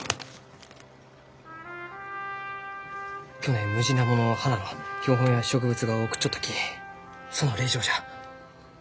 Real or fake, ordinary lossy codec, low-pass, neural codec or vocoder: real; none; none; none